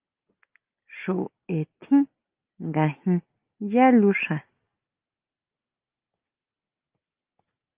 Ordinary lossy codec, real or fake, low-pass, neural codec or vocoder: Opus, 32 kbps; real; 3.6 kHz; none